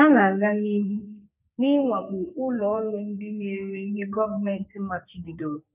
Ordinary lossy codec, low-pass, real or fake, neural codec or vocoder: none; 3.6 kHz; fake; codec, 44.1 kHz, 2.6 kbps, SNAC